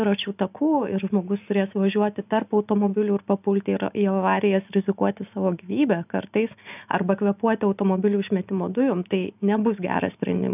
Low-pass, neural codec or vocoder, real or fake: 3.6 kHz; none; real